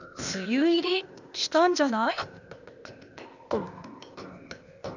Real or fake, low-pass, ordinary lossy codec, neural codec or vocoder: fake; 7.2 kHz; none; codec, 16 kHz, 0.8 kbps, ZipCodec